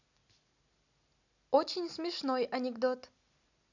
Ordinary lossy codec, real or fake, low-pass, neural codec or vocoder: none; real; 7.2 kHz; none